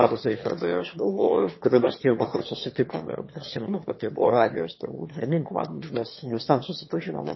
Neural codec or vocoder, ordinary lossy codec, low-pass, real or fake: autoencoder, 22.05 kHz, a latent of 192 numbers a frame, VITS, trained on one speaker; MP3, 24 kbps; 7.2 kHz; fake